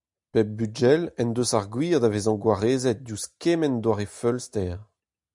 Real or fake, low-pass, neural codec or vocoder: real; 10.8 kHz; none